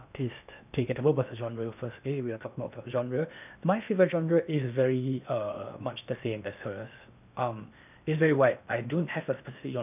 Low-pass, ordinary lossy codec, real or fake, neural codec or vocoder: 3.6 kHz; none; fake; codec, 16 kHz in and 24 kHz out, 0.8 kbps, FocalCodec, streaming, 65536 codes